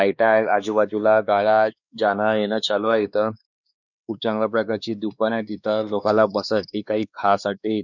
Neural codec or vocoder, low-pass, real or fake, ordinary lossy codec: codec, 16 kHz, 2 kbps, X-Codec, WavLM features, trained on Multilingual LibriSpeech; 7.2 kHz; fake; none